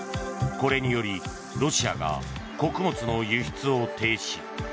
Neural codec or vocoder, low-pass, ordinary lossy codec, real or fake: none; none; none; real